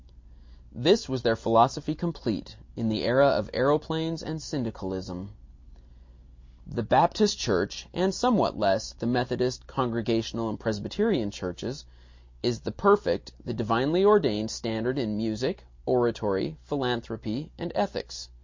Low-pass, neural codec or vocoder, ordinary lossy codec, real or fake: 7.2 kHz; none; MP3, 48 kbps; real